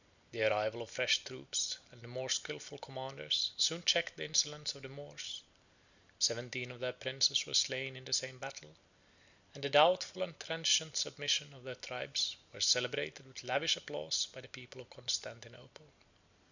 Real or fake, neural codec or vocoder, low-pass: real; none; 7.2 kHz